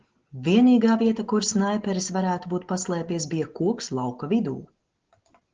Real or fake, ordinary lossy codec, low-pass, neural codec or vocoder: real; Opus, 16 kbps; 7.2 kHz; none